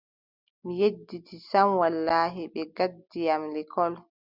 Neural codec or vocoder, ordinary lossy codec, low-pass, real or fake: none; Opus, 64 kbps; 5.4 kHz; real